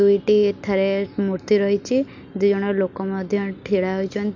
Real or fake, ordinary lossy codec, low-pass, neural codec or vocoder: real; none; 7.2 kHz; none